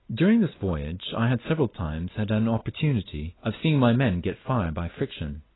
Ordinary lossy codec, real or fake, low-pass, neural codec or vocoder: AAC, 16 kbps; real; 7.2 kHz; none